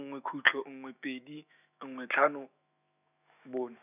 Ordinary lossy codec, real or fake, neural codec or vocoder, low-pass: none; fake; autoencoder, 48 kHz, 128 numbers a frame, DAC-VAE, trained on Japanese speech; 3.6 kHz